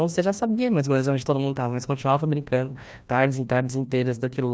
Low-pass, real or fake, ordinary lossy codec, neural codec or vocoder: none; fake; none; codec, 16 kHz, 1 kbps, FreqCodec, larger model